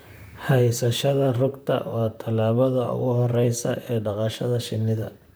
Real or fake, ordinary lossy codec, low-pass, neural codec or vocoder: fake; none; none; vocoder, 44.1 kHz, 128 mel bands, Pupu-Vocoder